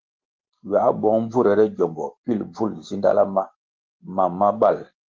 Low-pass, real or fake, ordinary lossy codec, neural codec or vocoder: 7.2 kHz; real; Opus, 16 kbps; none